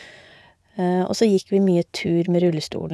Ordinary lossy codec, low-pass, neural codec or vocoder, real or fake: none; none; vocoder, 24 kHz, 100 mel bands, Vocos; fake